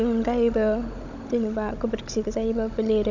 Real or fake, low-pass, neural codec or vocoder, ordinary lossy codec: fake; 7.2 kHz; codec, 16 kHz, 16 kbps, FunCodec, trained on Chinese and English, 50 frames a second; none